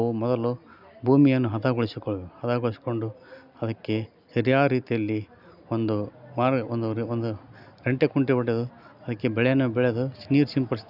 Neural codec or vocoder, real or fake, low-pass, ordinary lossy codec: none; real; 5.4 kHz; none